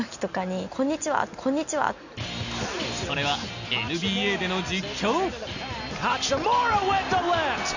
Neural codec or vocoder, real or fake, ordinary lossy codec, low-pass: none; real; none; 7.2 kHz